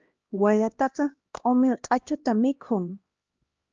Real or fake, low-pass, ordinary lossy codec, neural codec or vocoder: fake; 7.2 kHz; Opus, 24 kbps; codec, 16 kHz, 1 kbps, X-Codec, HuBERT features, trained on LibriSpeech